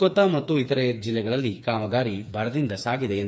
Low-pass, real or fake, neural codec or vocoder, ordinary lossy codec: none; fake; codec, 16 kHz, 4 kbps, FreqCodec, smaller model; none